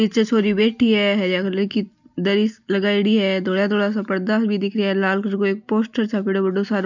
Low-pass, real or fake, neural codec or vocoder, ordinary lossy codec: 7.2 kHz; real; none; none